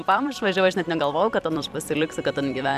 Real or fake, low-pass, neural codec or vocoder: fake; 14.4 kHz; vocoder, 44.1 kHz, 128 mel bands every 256 samples, BigVGAN v2